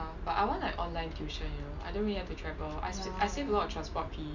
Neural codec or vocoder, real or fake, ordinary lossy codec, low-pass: none; real; none; 7.2 kHz